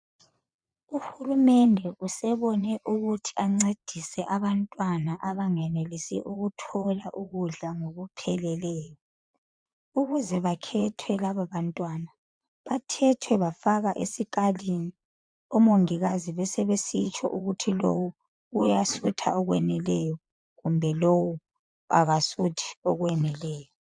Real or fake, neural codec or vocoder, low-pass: fake; vocoder, 22.05 kHz, 80 mel bands, Vocos; 9.9 kHz